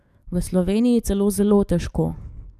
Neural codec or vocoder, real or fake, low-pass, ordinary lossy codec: codec, 44.1 kHz, 7.8 kbps, DAC; fake; 14.4 kHz; none